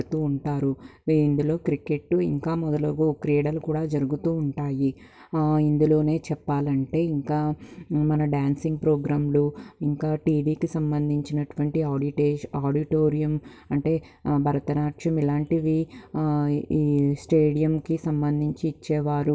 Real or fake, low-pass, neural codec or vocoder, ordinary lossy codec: real; none; none; none